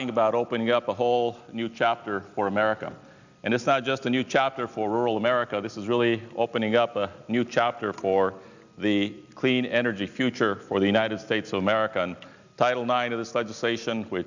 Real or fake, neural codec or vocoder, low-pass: real; none; 7.2 kHz